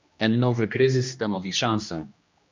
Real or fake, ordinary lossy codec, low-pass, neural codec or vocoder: fake; MP3, 64 kbps; 7.2 kHz; codec, 16 kHz, 1 kbps, X-Codec, HuBERT features, trained on general audio